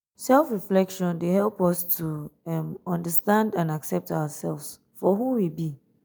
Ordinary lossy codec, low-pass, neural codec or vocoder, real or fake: none; none; none; real